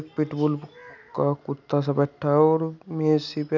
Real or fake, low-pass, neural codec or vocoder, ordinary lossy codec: real; 7.2 kHz; none; none